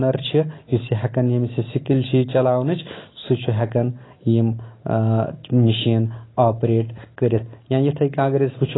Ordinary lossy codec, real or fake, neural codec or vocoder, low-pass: AAC, 16 kbps; real; none; 7.2 kHz